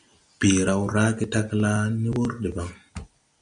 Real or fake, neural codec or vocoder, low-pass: real; none; 9.9 kHz